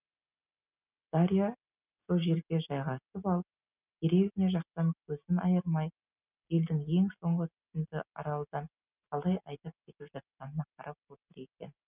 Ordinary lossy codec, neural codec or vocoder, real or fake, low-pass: none; none; real; 3.6 kHz